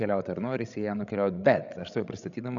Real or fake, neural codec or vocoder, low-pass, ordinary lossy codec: fake; codec, 16 kHz, 16 kbps, FreqCodec, larger model; 7.2 kHz; MP3, 96 kbps